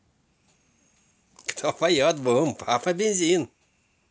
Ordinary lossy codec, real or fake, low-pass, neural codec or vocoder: none; real; none; none